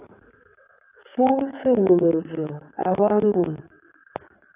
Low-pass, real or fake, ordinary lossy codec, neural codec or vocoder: 3.6 kHz; fake; AAC, 32 kbps; codec, 16 kHz in and 24 kHz out, 2.2 kbps, FireRedTTS-2 codec